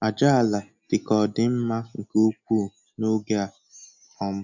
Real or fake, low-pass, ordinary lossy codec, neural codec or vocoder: real; 7.2 kHz; none; none